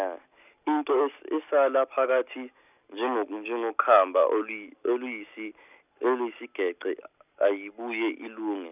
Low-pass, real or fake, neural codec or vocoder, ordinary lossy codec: 3.6 kHz; real; none; none